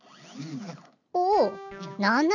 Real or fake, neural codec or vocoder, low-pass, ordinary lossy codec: real; none; 7.2 kHz; none